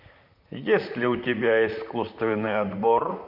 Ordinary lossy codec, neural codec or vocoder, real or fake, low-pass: MP3, 48 kbps; vocoder, 44.1 kHz, 128 mel bands, Pupu-Vocoder; fake; 5.4 kHz